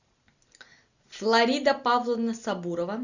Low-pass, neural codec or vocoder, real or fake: 7.2 kHz; none; real